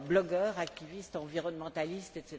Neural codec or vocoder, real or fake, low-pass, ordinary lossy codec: none; real; none; none